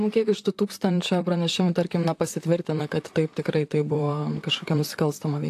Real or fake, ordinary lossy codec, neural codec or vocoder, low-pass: fake; AAC, 64 kbps; vocoder, 44.1 kHz, 128 mel bands, Pupu-Vocoder; 14.4 kHz